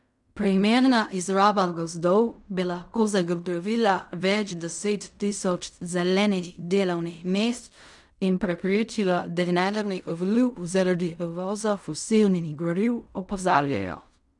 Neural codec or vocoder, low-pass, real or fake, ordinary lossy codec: codec, 16 kHz in and 24 kHz out, 0.4 kbps, LongCat-Audio-Codec, fine tuned four codebook decoder; 10.8 kHz; fake; none